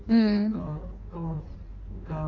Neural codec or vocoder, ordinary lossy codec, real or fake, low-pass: codec, 16 kHz in and 24 kHz out, 1.1 kbps, FireRedTTS-2 codec; none; fake; 7.2 kHz